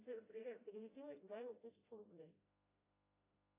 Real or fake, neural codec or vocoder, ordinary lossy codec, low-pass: fake; codec, 16 kHz, 0.5 kbps, FreqCodec, smaller model; AAC, 24 kbps; 3.6 kHz